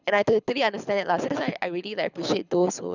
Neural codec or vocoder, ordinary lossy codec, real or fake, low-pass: codec, 24 kHz, 6 kbps, HILCodec; none; fake; 7.2 kHz